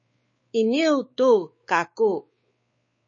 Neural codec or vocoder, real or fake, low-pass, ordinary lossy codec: codec, 16 kHz, 4 kbps, X-Codec, WavLM features, trained on Multilingual LibriSpeech; fake; 7.2 kHz; MP3, 32 kbps